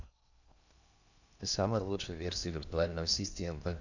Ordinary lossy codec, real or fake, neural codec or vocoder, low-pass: none; fake; codec, 16 kHz in and 24 kHz out, 0.8 kbps, FocalCodec, streaming, 65536 codes; 7.2 kHz